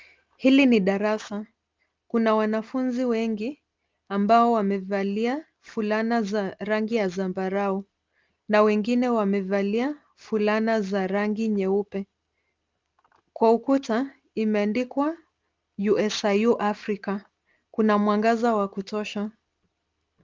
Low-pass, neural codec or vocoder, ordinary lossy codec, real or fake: 7.2 kHz; none; Opus, 32 kbps; real